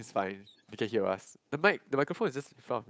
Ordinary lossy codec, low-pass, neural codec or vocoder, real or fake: none; none; codec, 16 kHz, 8 kbps, FunCodec, trained on Chinese and English, 25 frames a second; fake